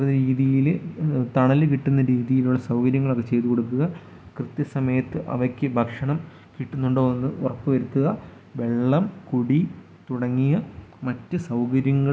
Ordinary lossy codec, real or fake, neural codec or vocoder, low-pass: none; real; none; none